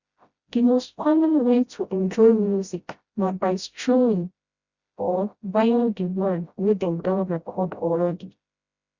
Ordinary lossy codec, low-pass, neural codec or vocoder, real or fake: Opus, 64 kbps; 7.2 kHz; codec, 16 kHz, 0.5 kbps, FreqCodec, smaller model; fake